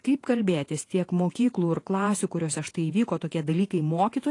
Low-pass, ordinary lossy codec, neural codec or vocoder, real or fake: 10.8 kHz; AAC, 48 kbps; vocoder, 48 kHz, 128 mel bands, Vocos; fake